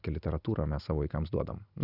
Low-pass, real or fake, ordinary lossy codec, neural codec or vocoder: 5.4 kHz; real; Opus, 64 kbps; none